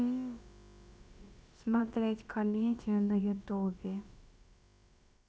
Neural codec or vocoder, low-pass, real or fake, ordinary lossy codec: codec, 16 kHz, about 1 kbps, DyCAST, with the encoder's durations; none; fake; none